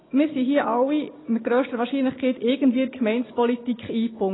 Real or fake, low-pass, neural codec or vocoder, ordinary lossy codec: real; 7.2 kHz; none; AAC, 16 kbps